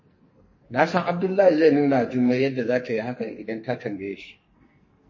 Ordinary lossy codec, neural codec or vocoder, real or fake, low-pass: MP3, 32 kbps; codec, 44.1 kHz, 2.6 kbps, SNAC; fake; 7.2 kHz